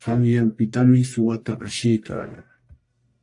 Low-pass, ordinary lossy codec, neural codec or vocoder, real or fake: 10.8 kHz; AAC, 64 kbps; codec, 44.1 kHz, 1.7 kbps, Pupu-Codec; fake